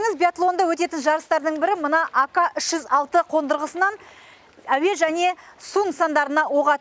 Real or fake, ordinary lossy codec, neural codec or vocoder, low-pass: real; none; none; none